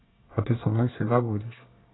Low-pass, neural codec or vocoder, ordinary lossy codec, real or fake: 7.2 kHz; codec, 24 kHz, 1 kbps, SNAC; AAC, 16 kbps; fake